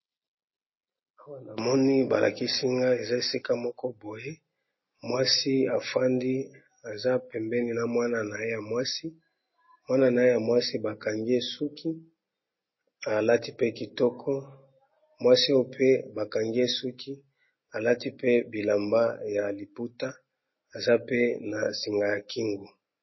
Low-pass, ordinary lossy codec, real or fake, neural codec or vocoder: 7.2 kHz; MP3, 24 kbps; real; none